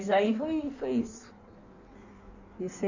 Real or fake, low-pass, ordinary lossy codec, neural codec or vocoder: fake; 7.2 kHz; Opus, 64 kbps; codec, 16 kHz in and 24 kHz out, 1.1 kbps, FireRedTTS-2 codec